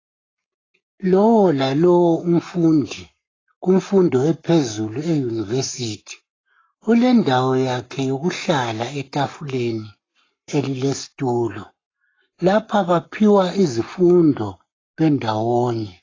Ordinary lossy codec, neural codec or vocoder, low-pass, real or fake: AAC, 32 kbps; codec, 44.1 kHz, 7.8 kbps, Pupu-Codec; 7.2 kHz; fake